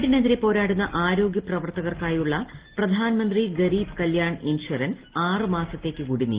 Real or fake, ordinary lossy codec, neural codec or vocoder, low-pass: real; Opus, 16 kbps; none; 3.6 kHz